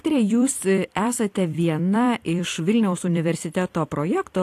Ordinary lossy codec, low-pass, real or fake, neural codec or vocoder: AAC, 64 kbps; 14.4 kHz; fake; vocoder, 44.1 kHz, 128 mel bands every 256 samples, BigVGAN v2